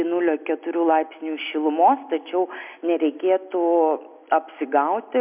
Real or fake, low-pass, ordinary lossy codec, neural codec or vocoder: real; 3.6 kHz; MP3, 32 kbps; none